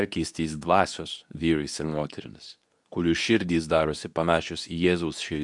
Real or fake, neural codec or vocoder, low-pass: fake; codec, 24 kHz, 0.9 kbps, WavTokenizer, medium speech release version 2; 10.8 kHz